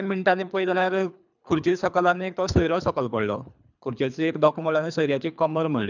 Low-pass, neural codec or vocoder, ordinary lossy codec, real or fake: 7.2 kHz; codec, 24 kHz, 3 kbps, HILCodec; none; fake